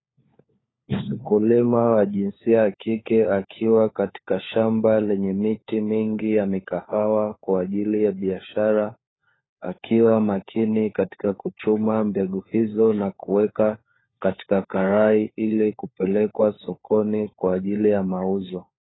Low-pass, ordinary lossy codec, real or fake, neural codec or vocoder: 7.2 kHz; AAC, 16 kbps; fake; codec, 16 kHz, 4 kbps, FunCodec, trained on LibriTTS, 50 frames a second